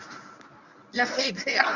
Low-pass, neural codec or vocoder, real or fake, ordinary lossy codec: 7.2 kHz; codec, 24 kHz, 0.9 kbps, WavTokenizer, medium speech release version 1; fake; none